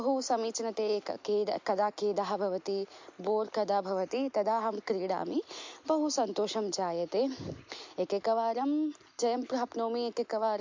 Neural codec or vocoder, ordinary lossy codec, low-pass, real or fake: none; MP3, 48 kbps; 7.2 kHz; real